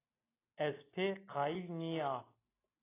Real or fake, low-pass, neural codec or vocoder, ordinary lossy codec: real; 3.6 kHz; none; AAC, 16 kbps